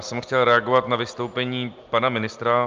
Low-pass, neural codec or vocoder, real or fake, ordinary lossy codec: 7.2 kHz; none; real; Opus, 24 kbps